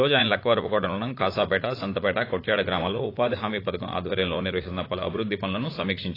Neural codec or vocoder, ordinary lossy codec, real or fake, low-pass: vocoder, 44.1 kHz, 80 mel bands, Vocos; AAC, 24 kbps; fake; 5.4 kHz